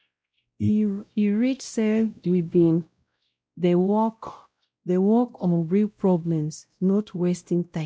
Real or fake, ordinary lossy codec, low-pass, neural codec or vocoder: fake; none; none; codec, 16 kHz, 0.5 kbps, X-Codec, WavLM features, trained on Multilingual LibriSpeech